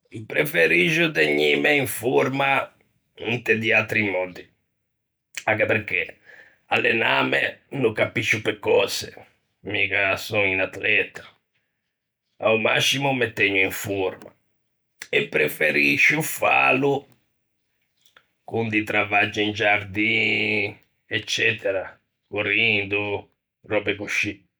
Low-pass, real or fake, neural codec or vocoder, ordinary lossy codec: none; real; none; none